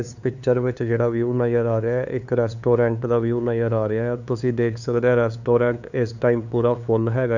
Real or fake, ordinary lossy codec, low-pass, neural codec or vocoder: fake; none; 7.2 kHz; codec, 16 kHz, 2 kbps, FunCodec, trained on LibriTTS, 25 frames a second